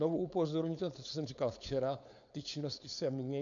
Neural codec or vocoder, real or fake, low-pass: codec, 16 kHz, 4.8 kbps, FACodec; fake; 7.2 kHz